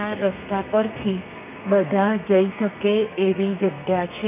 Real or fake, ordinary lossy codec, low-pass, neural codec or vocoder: fake; none; 3.6 kHz; codec, 32 kHz, 1.9 kbps, SNAC